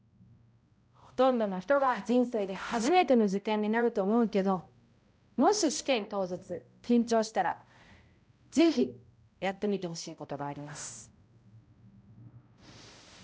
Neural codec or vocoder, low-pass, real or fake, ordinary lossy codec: codec, 16 kHz, 0.5 kbps, X-Codec, HuBERT features, trained on balanced general audio; none; fake; none